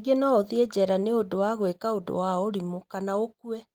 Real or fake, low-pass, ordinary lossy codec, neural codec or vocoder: real; 19.8 kHz; Opus, 24 kbps; none